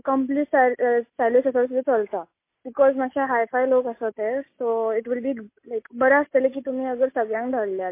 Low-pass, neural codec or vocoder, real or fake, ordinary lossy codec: 3.6 kHz; none; real; MP3, 24 kbps